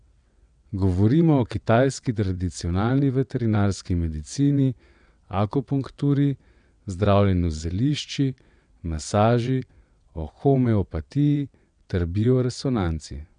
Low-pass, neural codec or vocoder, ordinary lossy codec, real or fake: 9.9 kHz; vocoder, 22.05 kHz, 80 mel bands, WaveNeXt; none; fake